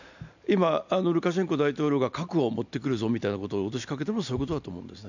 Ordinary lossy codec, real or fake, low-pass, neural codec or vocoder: none; real; 7.2 kHz; none